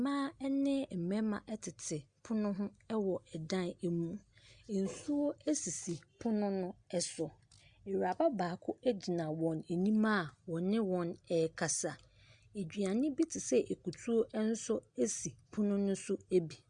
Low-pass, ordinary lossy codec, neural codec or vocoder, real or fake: 9.9 kHz; Opus, 64 kbps; none; real